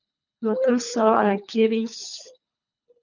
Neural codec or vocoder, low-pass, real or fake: codec, 24 kHz, 3 kbps, HILCodec; 7.2 kHz; fake